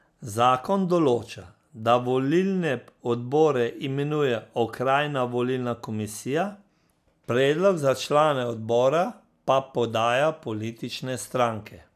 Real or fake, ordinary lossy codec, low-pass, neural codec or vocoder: real; none; 14.4 kHz; none